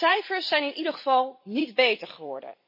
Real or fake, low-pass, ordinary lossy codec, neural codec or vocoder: fake; 5.4 kHz; MP3, 24 kbps; vocoder, 44.1 kHz, 128 mel bands every 256 samples, BigVGAN v2